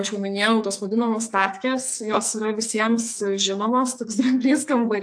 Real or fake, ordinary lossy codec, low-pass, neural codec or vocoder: fake; AAC, 64 kbps; 9.9 kHz; codec, 44.1 kHz, 2.6 kbps, SNAC